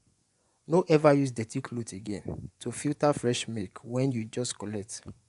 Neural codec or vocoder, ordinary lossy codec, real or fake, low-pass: vocoder, 24 kHz, 100 mel bands, Vocos; AAC, 96 kbps; fake; 10.8 kHz